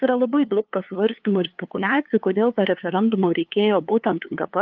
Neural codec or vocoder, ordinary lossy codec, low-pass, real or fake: codec, 16 kHz, 2 kbps, FunCodec, trained on LibriTTS, 25 frames a second; Opus, 24 kbps; 7.2 kHz; fake